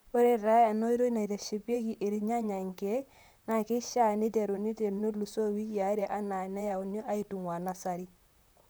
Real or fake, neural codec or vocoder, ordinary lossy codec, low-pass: fake; vocoder, 44.1 kHz, 128 mel bands, Pupu-Vocoder; none; none